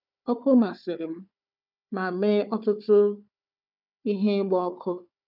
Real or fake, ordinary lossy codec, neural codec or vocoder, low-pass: fake; none; codec, 16 kHz, 4 kbps, FunCodec, trained on Chinese and English, 50 frames a second; 5.4 kHz